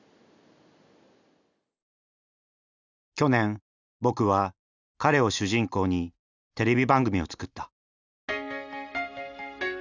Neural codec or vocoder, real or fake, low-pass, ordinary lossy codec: none; real; 7.2 kHz; none